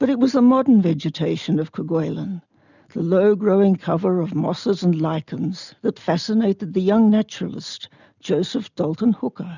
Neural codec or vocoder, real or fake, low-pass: none; real; 7.2 kHz